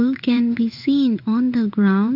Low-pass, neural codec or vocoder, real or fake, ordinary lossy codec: 5.4 kHz; codec, 16 kHz in and 24 kHz out, 1 kbps, XY-Tokenizer; fake; none